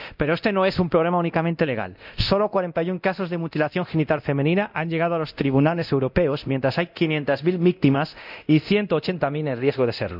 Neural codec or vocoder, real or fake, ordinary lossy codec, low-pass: codec, 24 kHz, 0.9 kbps, DualCodec; fake; none; 5.4 kHz